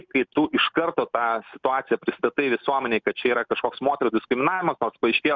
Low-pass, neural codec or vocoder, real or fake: 7.2 kHz; none; real